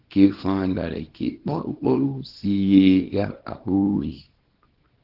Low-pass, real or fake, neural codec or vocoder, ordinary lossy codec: 5.4 kHz; fake; codec, 24 kHz, 0.9 kbps, WavTokenizer, small release; Opus, 16 kbps